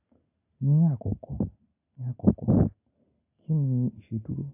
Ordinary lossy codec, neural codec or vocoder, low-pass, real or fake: AAC, 32 kbps; none; 3.6 kHz; real